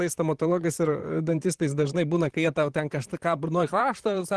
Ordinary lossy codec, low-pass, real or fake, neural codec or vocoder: Opus, 16 kbps; 10.8 kHz; fake; vocoder, 44.1 kHz, 128 mel bands, Pupu-Vocoder